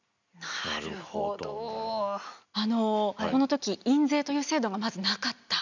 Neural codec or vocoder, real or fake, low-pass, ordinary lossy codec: none; real; 7.2 kHz; none